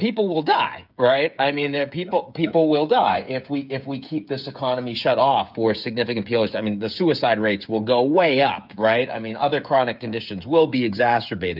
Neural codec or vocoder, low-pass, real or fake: codec, 16 kHz, 8 kbps, FreqCodec, smaller model; 5.4 kHz; fake